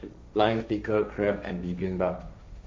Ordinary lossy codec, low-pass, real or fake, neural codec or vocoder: none; none; fake; codec, 16 kHz, 1.1 kbps, Voila-Tokenizer